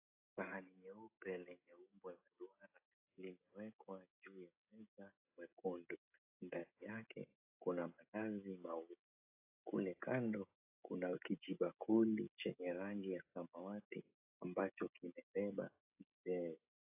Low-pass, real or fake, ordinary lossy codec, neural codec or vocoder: 3.6 kHz; fake; AAC, 32 kbps; codec, 16 kHz, 16 kbps, FreqCodec, smaller model